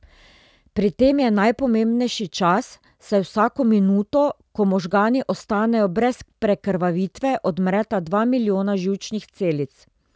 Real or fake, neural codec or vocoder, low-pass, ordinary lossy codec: real; none; none; none